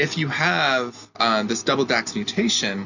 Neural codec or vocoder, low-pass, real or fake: none; 7.2 kHz; real